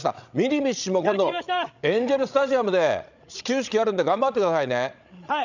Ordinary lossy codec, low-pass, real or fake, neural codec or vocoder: none; 7.2 kHz; fake; codec, 16 kHz, 16 kbps, FreqCodec, larger model